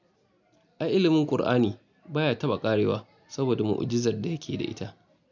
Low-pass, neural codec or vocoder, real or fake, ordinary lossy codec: 7.2 kHz; none; real; none